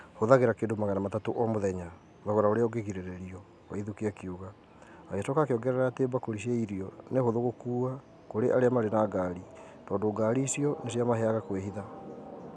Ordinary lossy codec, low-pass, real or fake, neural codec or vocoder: none; none; real; none